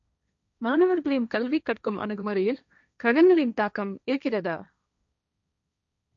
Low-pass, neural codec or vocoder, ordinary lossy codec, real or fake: 7.2 kHz; codec, 16 kHz, 1.1 kbps, Voila-Tokenizer; none; fake